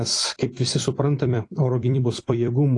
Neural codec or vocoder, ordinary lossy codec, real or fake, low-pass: vocoder, 44.1 kHz, 128 mel bands every 256 samples, BigVGAN v2; AAC, 48 kbps; fake; 10.8 kHz